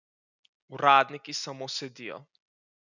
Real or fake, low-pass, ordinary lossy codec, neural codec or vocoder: real; 7.2 kHz; none; none